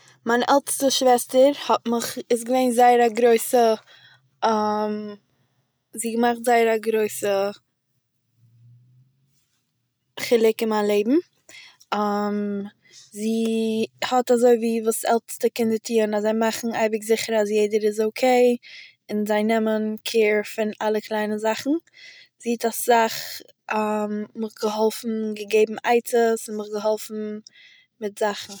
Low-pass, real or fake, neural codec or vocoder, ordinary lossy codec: none; real; none; none